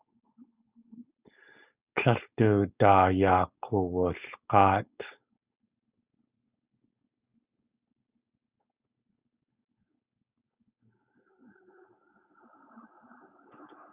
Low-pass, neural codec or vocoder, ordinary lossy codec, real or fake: 3.6 kHz; codec, 16 kHz, 4.8 kbps, FACodec; Opus, 64 kbps; fake